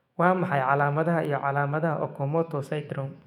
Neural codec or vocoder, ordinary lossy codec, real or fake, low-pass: autoencoder, 48 kHz, 128 numbers a frame, DAC-VAE, trained on Japanese speech; none; fake; 19.8 kHz